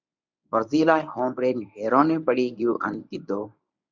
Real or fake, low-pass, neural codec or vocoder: fake; 7.2 kHz; codec, 24 kHz, 0.9 kbps, WavTokenizer, medium speech release version 1